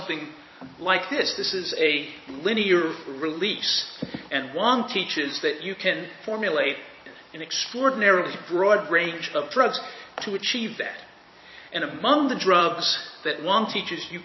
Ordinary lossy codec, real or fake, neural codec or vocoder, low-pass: MP3, 24 kbps; fake; vocoder, 44.1 kHz, 128 mel bands every 256 samples, BigVGAN v2; 7.2 kHz